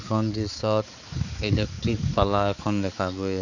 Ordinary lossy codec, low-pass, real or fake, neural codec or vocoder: none; 7.2 kHz; fake; codec, 16 kHz, 4 kbps, X-Codec, HuBERT features, trained on balanced general audio